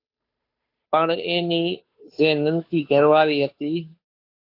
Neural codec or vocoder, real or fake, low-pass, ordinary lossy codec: codec, 16 kHz, 2 kbps, FunCodec, trained on Chinese and English, 25 frames a second; fake; 5.4 kHz; AAC, 32 kbps